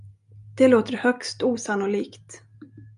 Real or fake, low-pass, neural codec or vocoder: real; 10.8 kHz; none